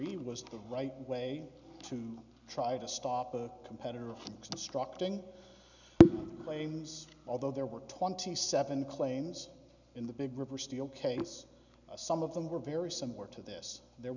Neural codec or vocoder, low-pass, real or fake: none; 7.2 kHz; real